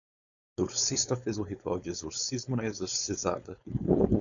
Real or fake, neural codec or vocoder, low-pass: fake; codec, 16 kHz, 4.8 kbps, FACodec; 7.2 kHz